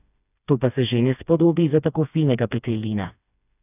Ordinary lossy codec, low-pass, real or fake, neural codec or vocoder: none; 3.6 kHz; fake; codec, 16 kHz, 2 kbps, FreqCodec, smaller model